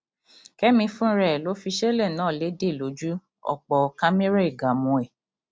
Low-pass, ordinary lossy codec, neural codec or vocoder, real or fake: none; none; none; real